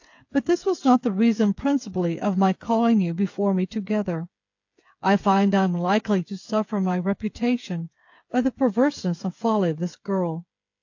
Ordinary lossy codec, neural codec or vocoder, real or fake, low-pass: AAC, 48 kbps; codec, 16 kHz, 8 kbps, FreqCodec, smaller model; fake; 7.2 kHz